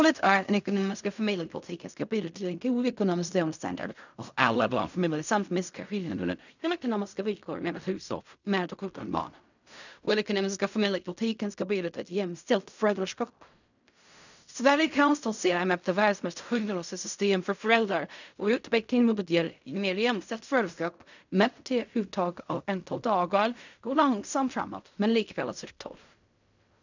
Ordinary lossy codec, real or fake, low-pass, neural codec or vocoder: none; fake; 7.2 kHz; codec, 16 kHz in and 24 kHz out, 0.4 kbps, LongCat-Audio-Codec, fine tuned four codebook decoder